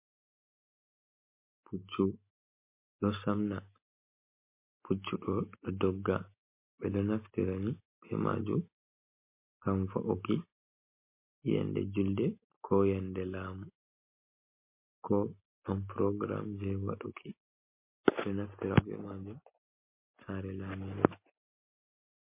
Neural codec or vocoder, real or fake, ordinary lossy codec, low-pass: none; real; MP3, 24 kbps; 3.6 kHz